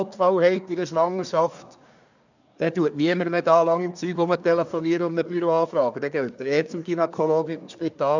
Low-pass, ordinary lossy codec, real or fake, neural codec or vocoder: 7.2 kHz; none; fake; codec, 24 kHz, 1 kbps, SNAC